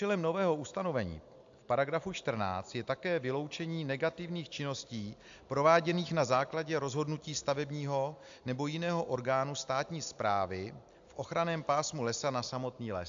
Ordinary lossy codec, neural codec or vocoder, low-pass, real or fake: AAC, 64 kbps; none; 7.2 kHz; real